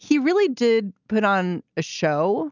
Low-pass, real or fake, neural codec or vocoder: 7.2 kHz; real; none